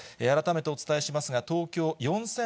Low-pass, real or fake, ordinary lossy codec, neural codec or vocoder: none; real; none; none